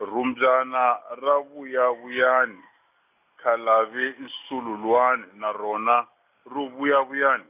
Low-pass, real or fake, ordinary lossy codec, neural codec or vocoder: 3.6 kHz; real; none; none